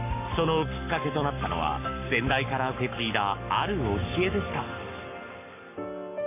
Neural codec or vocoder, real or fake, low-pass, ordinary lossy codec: codec, 44.1 kHz, 7.8 kbps, Pupu-Codec; fake; 3.6 kHz; none